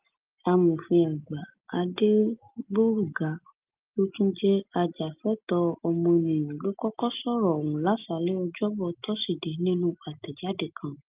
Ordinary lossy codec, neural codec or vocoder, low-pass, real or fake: Opus, 32 kbps; none; 3.6 kHz; real